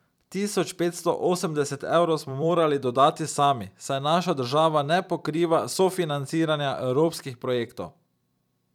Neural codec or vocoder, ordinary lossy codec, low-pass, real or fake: vocoder, 44.1 kHz, 128 mel bands every 256 samples, BigVGAN v2; none; 19.8 kHz; fake